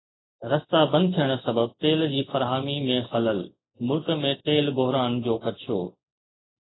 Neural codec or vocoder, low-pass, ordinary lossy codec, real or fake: none; 7.2 kHz; AAC, 16 kbps; real